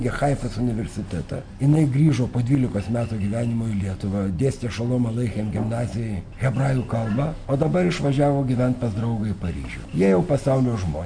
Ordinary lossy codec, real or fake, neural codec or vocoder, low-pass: MP3, 64 kbps; real; none; 9.9 kHz